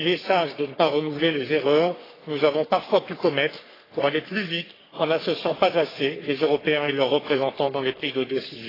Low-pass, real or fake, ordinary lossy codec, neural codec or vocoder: 5.4 kHz; fake; AAC, 24 kbps; codec, 44.1 kHz, 3.4 kbps, Pupu-Codec